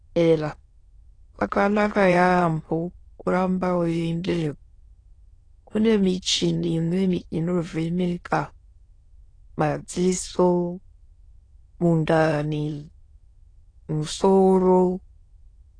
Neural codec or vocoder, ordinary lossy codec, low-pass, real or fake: autoencoder, 22.05 kHz, a latent of 192 numbers a frame, VITS, trained on many speakers; AAC, 32 kbps; 9.9 kHz; fake